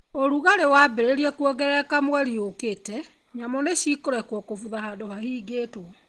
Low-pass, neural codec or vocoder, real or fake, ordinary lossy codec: 10.8 kHz; none; real; Opus, 16 kbps